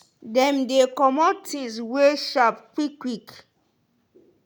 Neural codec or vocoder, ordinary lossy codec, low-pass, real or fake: none; none; none; real